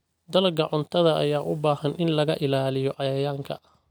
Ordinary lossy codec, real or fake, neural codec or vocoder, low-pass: none; real; none; none